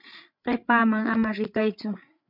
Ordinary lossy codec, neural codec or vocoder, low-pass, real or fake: MP3, 48 kbps; codec, 16 kHz, 8 kbps, FreqCodec, larger model; 5.4 kHz; fake